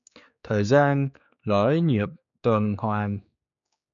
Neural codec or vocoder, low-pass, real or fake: codec, 16 kHz, 4 kbps, X-Codec, HuBERT features, trained on general audio; 7.2 kHz; fake